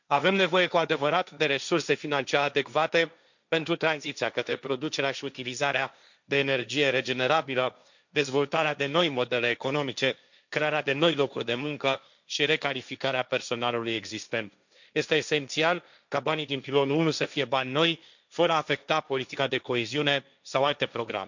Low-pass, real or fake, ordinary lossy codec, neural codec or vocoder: 7.2 kHz; fake; none; codec, 16 kHz, 1.1 kbps, Voila-Tokenizer